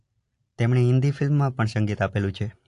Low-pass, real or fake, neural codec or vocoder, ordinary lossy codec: 10.8 kHz; real; none; AAC, 48 kbps